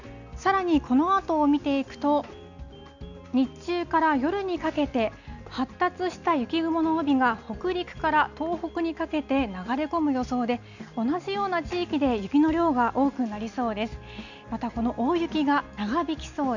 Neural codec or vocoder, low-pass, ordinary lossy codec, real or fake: none; 7.2 kHz; none; real